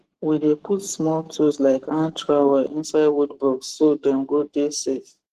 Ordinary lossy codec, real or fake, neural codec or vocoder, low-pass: Opus, 16 kbps; fake; codec, 44.1 kHz, 7.8 kbps, Pupu-Codec; 14.4 kHz